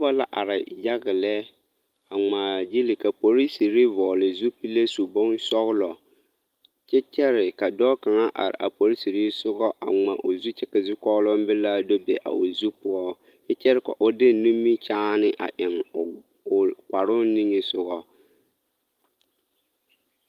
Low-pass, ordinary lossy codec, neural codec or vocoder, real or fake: 14.4 kHz; Opus, 32 kbps; none; real